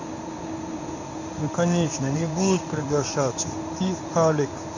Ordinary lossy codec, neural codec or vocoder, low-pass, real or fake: none; codec, 16 kHz in and 24 kHz out, 1 kbps, XY-Tokenizer; 7.2 kHz; fake